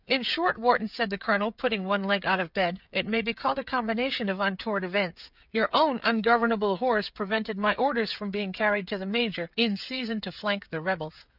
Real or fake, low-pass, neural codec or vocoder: fake; 5.4 kHz; codec, 16 kHz, 16 kbps, FreqCodec, smaller model